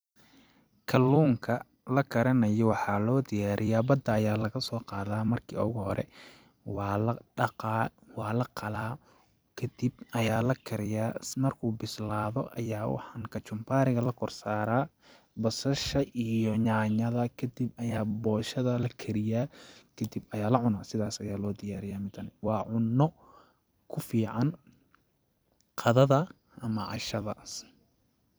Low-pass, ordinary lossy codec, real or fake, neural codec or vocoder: none; none; fake; vocoder, 44.1 kHz, 128 mel bands every 256 samples, BigVGAN v2